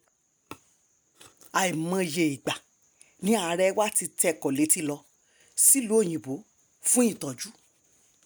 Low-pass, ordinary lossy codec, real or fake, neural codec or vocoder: none; none; real; none